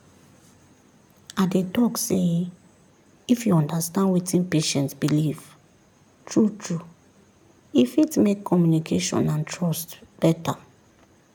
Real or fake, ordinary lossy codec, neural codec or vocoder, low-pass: real; none; none; 19.8 kHz